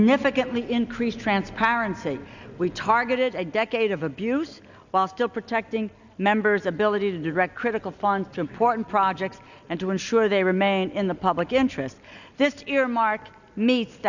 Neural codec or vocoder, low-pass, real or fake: none; 7.2 kHz; real